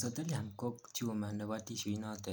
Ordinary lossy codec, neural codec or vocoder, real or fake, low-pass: none; none; real; none